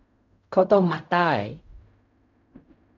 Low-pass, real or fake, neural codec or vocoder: 7.2 kHz; fake; codec, 16 kHz in and 24 kHz out, 0.4 kbps, LongCat-Audio-Codec, fine tuned four codebook decoder